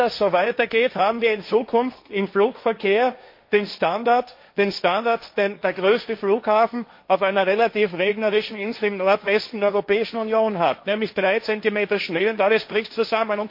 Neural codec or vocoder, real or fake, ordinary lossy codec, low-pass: codec, 16 kHz, 1.1 kbps, Voila-Tokenizer; fake; MP3, 32 kbps; 5.4 kHz